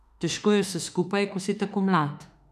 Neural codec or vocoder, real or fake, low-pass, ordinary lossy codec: autoencoder, 48 kHz, 32 numbers a frame, DAC-VAE, trained on Japanese speech; fake; 14.4 kHz; none